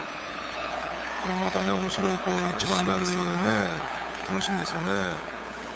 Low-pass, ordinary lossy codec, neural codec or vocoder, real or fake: none; none; codec, 16 kHz, 8 kbps, FunCodec, trained on LibriTTS, 25 frames a second; fake